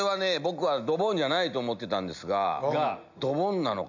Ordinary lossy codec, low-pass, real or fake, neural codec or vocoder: none; 7.2 kHz; real; none